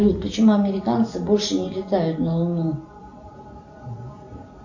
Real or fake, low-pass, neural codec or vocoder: real; 7.2 kHz; none